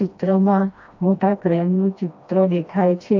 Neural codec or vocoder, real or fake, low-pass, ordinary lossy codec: codec, 16 kHz, 1 kbps, FreqCodec, smaller model; fake; 7.2 kHz; AAC, 48 kbps